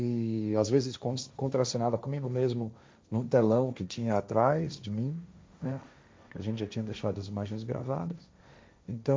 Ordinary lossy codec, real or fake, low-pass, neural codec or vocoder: none; fake; none; codec, 16 kHz, 1.1 kbps, Voila-Tokenizer